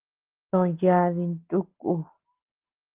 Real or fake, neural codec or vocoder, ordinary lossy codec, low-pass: real; none; Opus, 16 kbps; 3.6 kHz